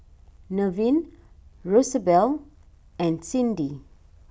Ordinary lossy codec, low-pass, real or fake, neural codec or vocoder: none; none; real; none